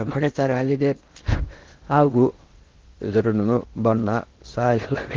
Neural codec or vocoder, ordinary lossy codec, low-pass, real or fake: codec, 16 kHz in and 24 kHz out, 0.6 kbps, FocalCodec, streaming, 4096 codes; Opus, 16 kbps; 7.2 kHz; fake